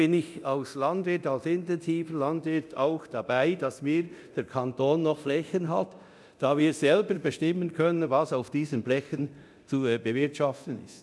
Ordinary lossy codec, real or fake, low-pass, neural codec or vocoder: none; fake; none; codec, 24 kHz, 0.9 kbps, DualCodec